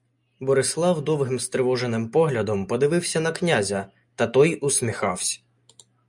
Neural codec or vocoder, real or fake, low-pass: none; real; 10.8 kHz